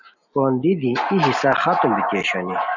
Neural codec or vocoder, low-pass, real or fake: none; 7.2 kHz; real